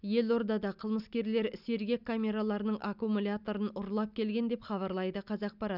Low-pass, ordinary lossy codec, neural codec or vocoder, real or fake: 5.4 kHz; none; none; real